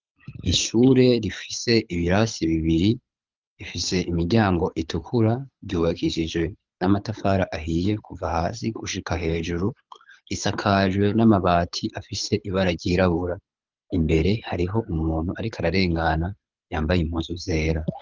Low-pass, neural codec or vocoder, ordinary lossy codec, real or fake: 7.2 kHz; codec, 24 kHz, 6 kbps, HILCodec; Opus, 24 kbps; fake